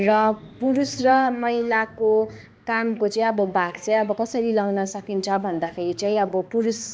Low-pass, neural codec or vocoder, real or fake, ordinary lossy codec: none; codec, 16 kHz, 2 kbps, X-Codec, HuBERT features, trained on balanced general audio; fake; none